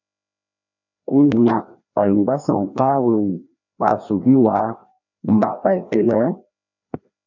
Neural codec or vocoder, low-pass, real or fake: codec, 16 kHz, 1 kbps, FreqCodec, larger model; 7.2 kHz; fake